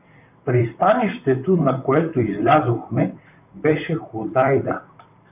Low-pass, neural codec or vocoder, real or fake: 3.6 kHz; vocoder, 44.1 kHz, 128 mel bands, Pupu-Vocoder; fake